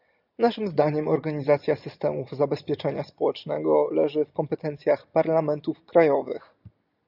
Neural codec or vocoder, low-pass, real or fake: none; 5.4 kHz; real